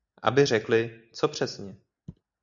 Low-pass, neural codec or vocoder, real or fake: 7.2 kHz; none; real